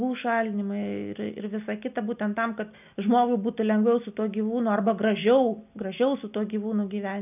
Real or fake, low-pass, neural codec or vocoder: real; 3.6 kHz; none